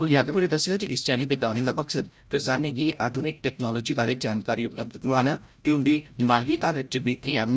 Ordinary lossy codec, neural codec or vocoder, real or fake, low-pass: none; codec, 16 kHz, 0.5 kbps, FreqCodec, larger model; fake; none